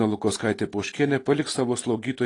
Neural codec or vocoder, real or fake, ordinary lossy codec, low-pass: none; real; AAC, 32 kbps; 10.8 kHz